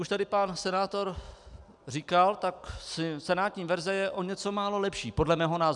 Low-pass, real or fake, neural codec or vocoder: 10.8 kHz; real; none